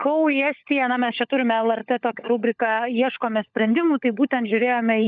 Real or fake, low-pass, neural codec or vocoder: fake; 7.2 kHz; codec, 16 kHz, 16 kbps, FunCodec, trained on LibriTTS, 50 frames a second